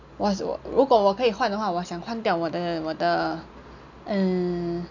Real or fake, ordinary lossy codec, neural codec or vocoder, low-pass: real; none; none; 7.2 kHz